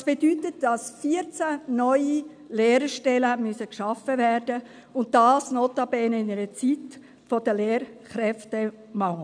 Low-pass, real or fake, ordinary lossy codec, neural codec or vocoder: 9.9 kHz; real; none; none